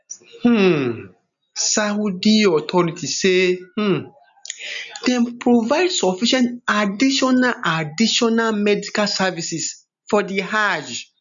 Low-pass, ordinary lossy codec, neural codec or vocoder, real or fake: 7.2 kHz; none; none; real